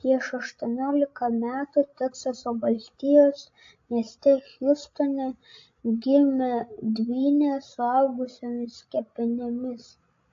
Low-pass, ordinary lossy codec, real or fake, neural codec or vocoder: 7.2 kHz; AAC, 48 kbps; fake; codec, 16 kHz, 16 kbps, FreqCodec, larger model